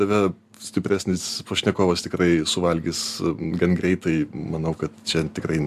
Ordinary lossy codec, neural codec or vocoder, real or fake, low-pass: AAC, 96 kbps; none; real; 14.4 kHz